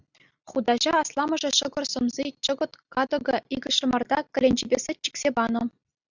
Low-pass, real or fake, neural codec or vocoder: 7.2 kHz; real; none